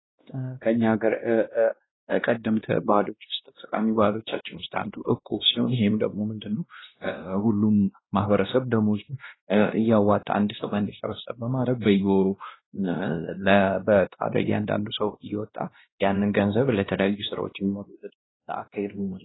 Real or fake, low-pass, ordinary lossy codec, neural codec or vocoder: fake; 7.2 kHz; AAC, 16 kbps; codec, 16 kHz, 1 kbps, X-Codec, WavLM features, trained on Multilingual LibriSpeech